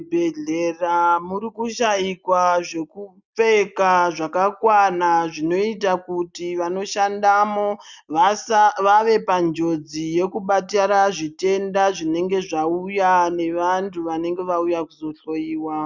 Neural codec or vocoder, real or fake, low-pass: none; real; 7.2 kHz